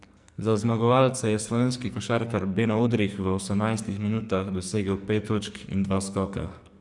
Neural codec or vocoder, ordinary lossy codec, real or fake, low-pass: codec, 44.1 kHz, 2.6 kbps, SNAC; none; fake; 10.8 kHz